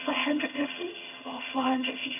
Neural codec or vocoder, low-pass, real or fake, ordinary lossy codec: vocoder, 22.05 kHz, 80 mel bands, HiFi-GAN; 3.6 kHz; fake; none